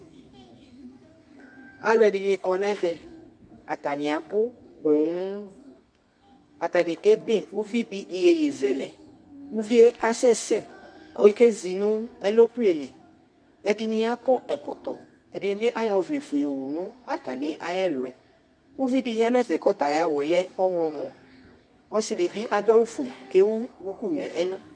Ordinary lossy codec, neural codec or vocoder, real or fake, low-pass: MP3, 64 kbps; codec, 24 kHz, 0.9 kbps, WavTokenizer, medium music audio release; fake; 9.9 kHz